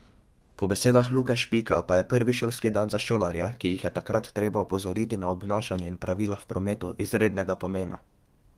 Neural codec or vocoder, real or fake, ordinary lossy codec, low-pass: codec, 32 kHz, 1.9 kbps, SNAC; fake; Opus, 32 kbps; 14.4 kHz